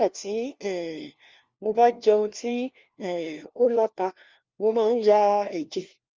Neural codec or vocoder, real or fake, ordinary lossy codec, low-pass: codec, 16 kHz, 1 kbps, FunCodec, trained on LibriTTS, 50 frames a second; fake; Opus, 32 kbps; 7.2 kHz